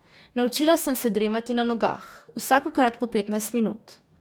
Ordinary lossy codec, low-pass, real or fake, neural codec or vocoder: none; none; fake; codec, 44.1 kHz, 2.6 kbps, DAC